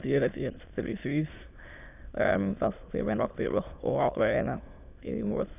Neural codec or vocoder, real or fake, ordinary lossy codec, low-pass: autoencoder, 22.05 kHz, a latent of 192 numbers a frame, VITS, trained on many speakers; fake; none; 3.6 kHz